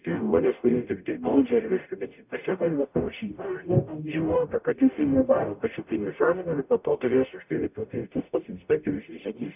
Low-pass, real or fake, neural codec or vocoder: 3.6 kHz; fake; codec, 44.1 kHz, 0.9 kbps, DAC